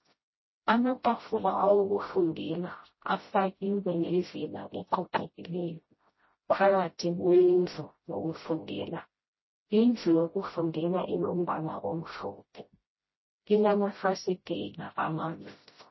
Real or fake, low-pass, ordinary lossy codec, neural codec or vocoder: fake; 7.2 kHz; MP3, 24 kbps; codec, 16 kHz, 0.5 kbps, FreqCodec, smaller model